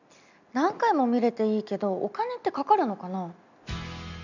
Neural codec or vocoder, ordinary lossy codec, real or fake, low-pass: none; none; real; 7.2 kHz